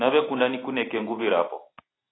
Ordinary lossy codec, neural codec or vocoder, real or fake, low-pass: AAC, 16 kbps; none; real; 7.2 kHz